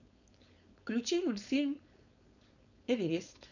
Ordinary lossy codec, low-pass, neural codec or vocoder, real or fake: none; 7.2 kHz; codec, 16 kHz, 4.8 kbps, FACodec; fake